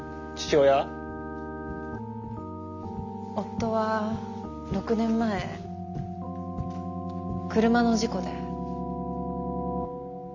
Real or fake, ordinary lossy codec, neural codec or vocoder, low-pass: real; none; none; 7.2 kHz